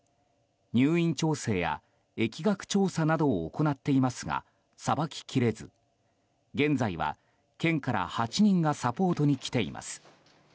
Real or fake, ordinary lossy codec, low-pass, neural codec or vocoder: real; none; none; none